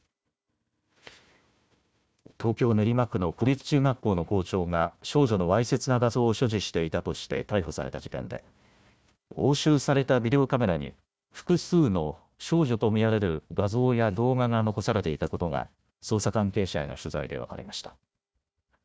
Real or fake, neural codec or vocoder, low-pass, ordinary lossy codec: fake; codec, 16 kHz, 1 kbps, FunCodec, trained on Chinese and English, 50 frames a second; none; none